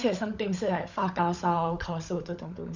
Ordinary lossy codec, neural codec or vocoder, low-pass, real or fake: none; codec, 16 kHz, 16 kbps, FunCodec, trained on LibriTTS, 50 frames a second; 7.2 kHz; fake